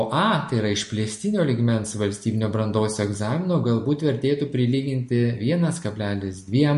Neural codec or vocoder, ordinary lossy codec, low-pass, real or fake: none; MP3, 48 kbps; 14.4 kHz; real